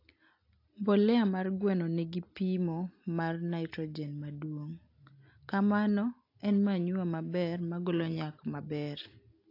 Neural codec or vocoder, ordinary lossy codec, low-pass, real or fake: none; AAC, 32 kbps; 5.4 kHz; real